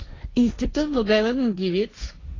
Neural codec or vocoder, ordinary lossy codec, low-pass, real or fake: codec, 16 kHz, 1.1 kbps, Voila-Tokenizer; AAC, 32 kbps; 7.2 kHz; fake